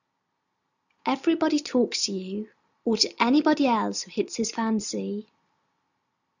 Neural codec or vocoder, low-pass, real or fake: none; 7.2 kHz; real